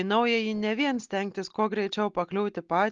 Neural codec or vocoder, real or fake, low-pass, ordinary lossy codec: none; real; 7.2 kHz; Opus, 32 kbps